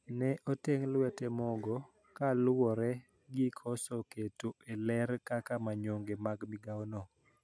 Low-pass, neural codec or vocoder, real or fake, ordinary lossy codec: none; none; real; none